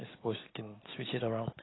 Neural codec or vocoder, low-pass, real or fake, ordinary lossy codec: none; 7.2 kHz; real; AAC, 16 kbps